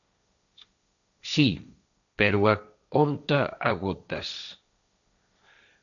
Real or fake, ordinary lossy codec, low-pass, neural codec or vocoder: fake; MP3, 96 kbps; 7.2 kHz; codec, 16 kHz, 1.1 kbps, Voila-Tokenizer